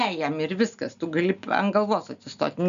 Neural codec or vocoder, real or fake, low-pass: none; real; 7.2 kHz